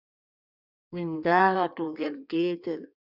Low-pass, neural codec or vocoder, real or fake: 5.4 kHz; codec, 16 kHz in and 24 kHz out, 1.1 kbps, FireRedTTS-2 codec; fake